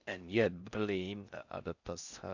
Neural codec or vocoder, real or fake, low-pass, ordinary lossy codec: codec, 16 kHz in and 24 kHz out, 0.6 kbps, FocalCodec, streaming, 4096 codes; fake; 7.2 kHz; Opus, 64 kbps